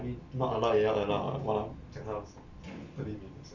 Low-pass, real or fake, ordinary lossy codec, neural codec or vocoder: 7.2 kHz; real; Opus, 64 kbps; none